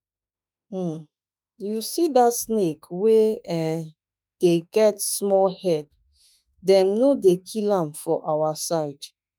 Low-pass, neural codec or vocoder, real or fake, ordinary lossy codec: none; autoencoder, 48 kHz, 32 numbers a frame, DAC-VAE, trained on Japanese speech; fake; none